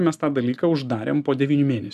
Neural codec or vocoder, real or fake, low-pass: none; real; 14.4 kHz